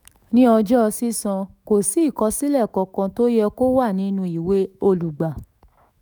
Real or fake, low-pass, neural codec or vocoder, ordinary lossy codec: fake; none; autoencoder, 48 kHz, 128 numbers a frame, DAC-VAE, trained on Japanese speech; none